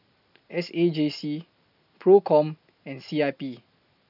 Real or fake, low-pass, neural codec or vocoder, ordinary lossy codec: real; 5.4 kHz; none; none